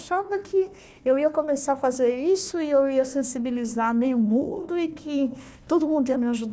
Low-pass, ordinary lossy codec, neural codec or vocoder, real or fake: none; none; codec, 16 kHz, 1 kbps, FunCodec, trained on Chinese and English, 50 frames a second; fake